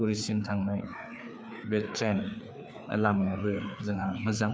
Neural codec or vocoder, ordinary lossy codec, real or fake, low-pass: codec, 16 kHz, 4 kbps, FreqCodec, larger model; none; fake; none